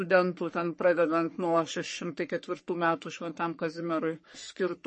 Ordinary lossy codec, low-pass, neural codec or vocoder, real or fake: MP3, 32 kbps; 10.8 kHz; codec, 44.1 kHz, 3.4 kbps, Pupu-Codec; fake